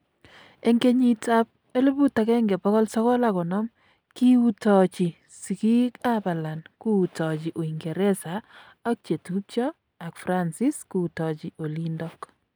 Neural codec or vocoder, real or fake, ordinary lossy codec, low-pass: none; real; none; none